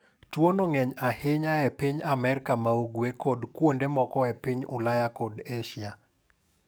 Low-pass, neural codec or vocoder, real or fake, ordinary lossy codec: none; codec, 44.1 kHz, 7.8 kbps, DAC; fake; none